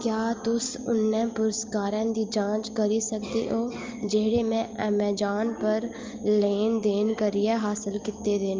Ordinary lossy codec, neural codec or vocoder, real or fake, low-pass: Opus, 32 kbps; none; real; 7.2 kHz